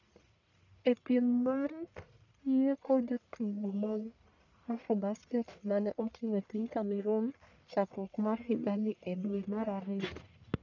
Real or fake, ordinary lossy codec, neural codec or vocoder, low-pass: fake; none; codec, 44.1 kHz, 1.7 kbps, Pupu-Codec; 7.2 kHz